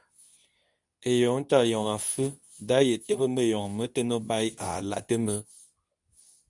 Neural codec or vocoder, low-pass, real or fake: codec, 24 kHz, 0.9 kbps, WavTokenizer, medium speech release version 2; 10.8 kHz; fake